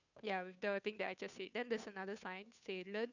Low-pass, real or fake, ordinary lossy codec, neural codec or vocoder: 7.2 kHz; fake; none; codec, 16 kHz, 2 kbps, FunCodec, trained on Chinese and English, 25 frames a second